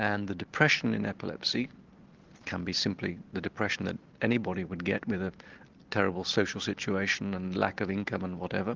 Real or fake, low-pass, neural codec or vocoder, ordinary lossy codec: real; 7.2 kHz; none; Opus, 16 kbps